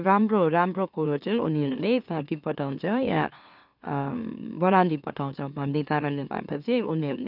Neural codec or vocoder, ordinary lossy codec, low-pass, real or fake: autoencoder, 44.1 kHz, a latent of 192 numbers a frame, MeloTTS; none; 5.4 kHz; fake